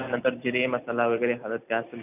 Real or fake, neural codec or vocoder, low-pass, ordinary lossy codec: real; none; 3.6 kHz; none